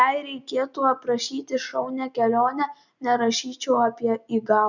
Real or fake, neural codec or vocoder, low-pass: real; none; 7.2 kHz